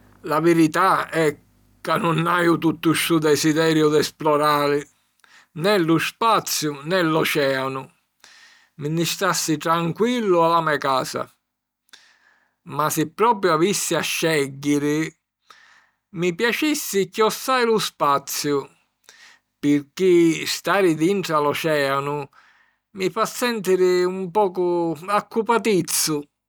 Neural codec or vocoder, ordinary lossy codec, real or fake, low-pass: none; none; real; none